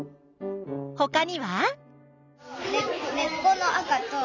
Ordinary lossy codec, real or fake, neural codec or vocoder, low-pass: none; real; none; 7.2 kHz